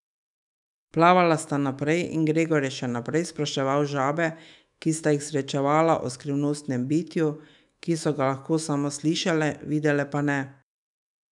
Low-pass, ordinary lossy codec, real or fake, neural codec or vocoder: 10.8 kHz; none; fake; autoencoder, 48 kHz, 128 numbers a frame, DAC-VAE, trained on Japanese speech